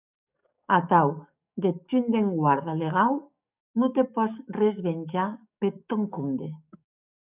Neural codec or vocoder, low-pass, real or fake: codec, 44.1 kHz, 7.8 kbps, DAC; 3.6 kHz; fake